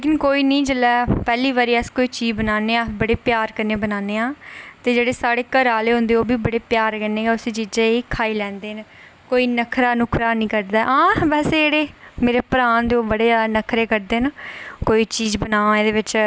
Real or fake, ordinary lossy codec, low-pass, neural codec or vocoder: real; none; none; none